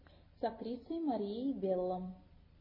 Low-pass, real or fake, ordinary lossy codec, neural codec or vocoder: 5.4 kHz; real; MP3, 24 kbps; none